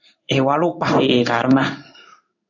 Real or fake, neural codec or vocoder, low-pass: fake; codec, 16 kHz in and 24 kHz out, 1 kbps, XY-Tokenizer; 7.2 kHz